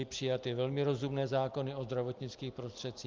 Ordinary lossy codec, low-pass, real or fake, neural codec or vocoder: Opus, 24 kbps; 7.2 kHz; real; none